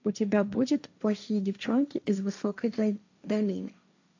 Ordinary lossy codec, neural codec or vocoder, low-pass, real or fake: AAC, 48 kbps; codec, 16 kHz, 1.1 kbps, Voila-Tokenizer; 7.2 kHz; fake